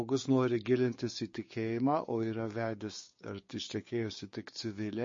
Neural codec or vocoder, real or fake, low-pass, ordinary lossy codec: codec, 16 kHz, 6 kbps, DAC; fake; 7.2 kHz; MP3, 32 kbps